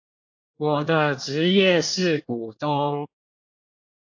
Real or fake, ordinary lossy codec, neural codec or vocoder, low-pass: fake; AAC, 48 kbps; codec, 24 kHz, 1 kbps, SNAC; 7.2 kHz